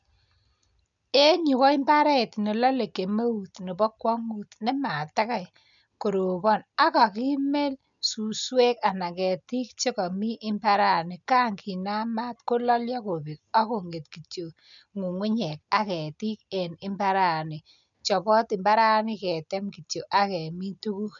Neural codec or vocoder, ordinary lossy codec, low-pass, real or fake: none; none; 7.2 kHz; real